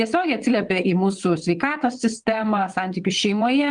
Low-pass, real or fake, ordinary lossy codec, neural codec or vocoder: 9.9 kHz; fake; Opus, 24 kbps; vocoder, 22.05 kHz, 80 mel bands, WaveNeXt